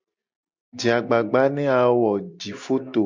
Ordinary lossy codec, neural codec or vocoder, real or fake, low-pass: MP3, 64 kbps; none; real; 7.2 kHz